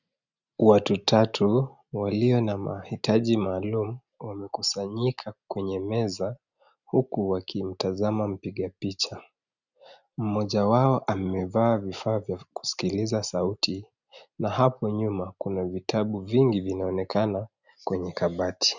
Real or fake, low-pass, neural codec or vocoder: real; 7.2 kHz; none